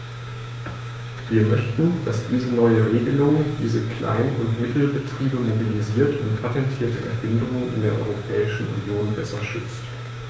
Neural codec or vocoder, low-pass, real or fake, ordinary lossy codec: codec, 16 kHz, 6 kbps, DAC; none; fake; none